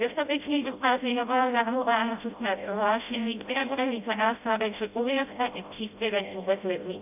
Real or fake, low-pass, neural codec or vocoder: fake; 3.6 kHz; codec, 16 kHz, 0.5 kbps, FreqCodec, smaller model